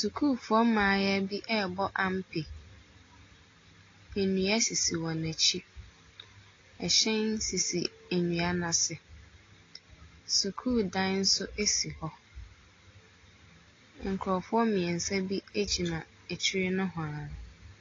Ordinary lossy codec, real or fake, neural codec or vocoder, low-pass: AAC, 32 kbps; real; none; 7.2 kHz